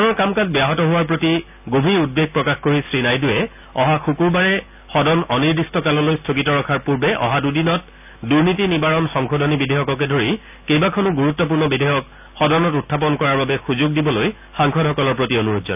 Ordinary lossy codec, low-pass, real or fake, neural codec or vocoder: none; 3.6 kHz; real; none